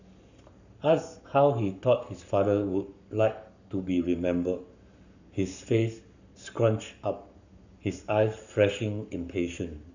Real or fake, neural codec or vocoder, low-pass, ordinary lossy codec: fake; codec, 44.1 kHz, 7.8 kbps, Pupu-Codec; 7.2 kHz; none